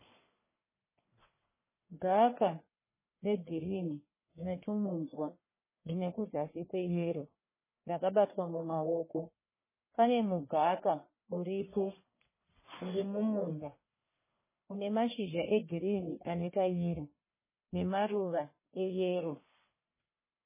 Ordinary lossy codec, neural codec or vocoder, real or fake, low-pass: MP3, 16 kbps; codec, 44.1 kHz, 1.7 kbps, Pupu-Codec; fake; 3.6 kHz